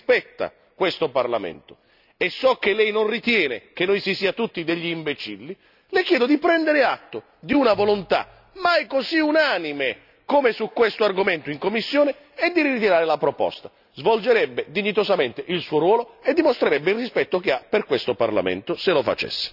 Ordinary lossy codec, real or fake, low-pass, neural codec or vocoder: none; real; 5.4 kHz; none